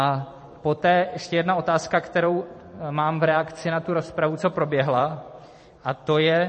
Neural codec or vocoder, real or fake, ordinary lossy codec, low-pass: vocoder, 44.1 kHz, 128 mel bands every 256 samples, BigVGAN v2; fake; MP3, 32 kbps; 10.8 kHz